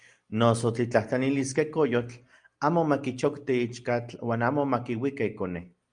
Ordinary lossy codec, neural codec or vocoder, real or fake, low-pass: Opus, 32 kbps; none; real; 9.9 kHz